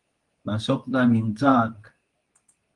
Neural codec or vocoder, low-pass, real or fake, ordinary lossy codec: codec, 24 kHz, 0.9 kbps, WavTokenizer, medium speech release version 2; 10.8 kHz; fake; Opus, 32 kbps